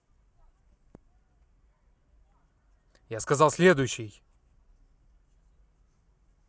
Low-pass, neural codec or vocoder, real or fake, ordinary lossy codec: none; none; real; none